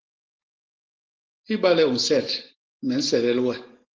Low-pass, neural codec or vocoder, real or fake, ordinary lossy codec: 7.2 kHz; none; real; Opus, 16 kbps